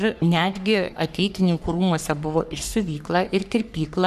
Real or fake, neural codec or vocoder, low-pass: fake; codec, 44.1 kHz, 3.4 kbps, Pupu-Codec; 14.4 kHz